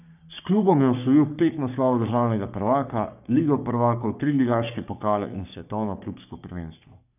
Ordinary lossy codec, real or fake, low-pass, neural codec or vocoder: none; fake; 3.6 kHz; codec, 44.1 kHz, 3.4 kbps, Pupu-Codec